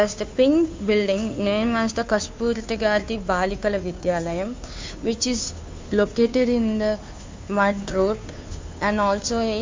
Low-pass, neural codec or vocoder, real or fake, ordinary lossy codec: 7.2 kHz; codec, 16 kHz, 2 kbps, FunCodec, trained on Chinese and English, 25 frames a second; fake; MP3, 64 kbps